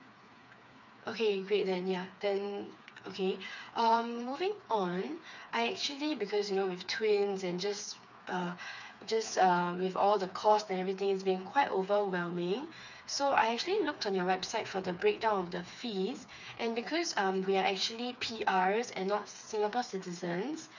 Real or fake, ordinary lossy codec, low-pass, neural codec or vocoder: fake; none; 7.2 kHz; codec, 16 kHz, 4 kbps, FreqCodec, smaller model